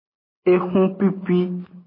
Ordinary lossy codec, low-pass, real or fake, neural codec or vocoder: MP3, 24 kbps; 5.4 kHz; real; none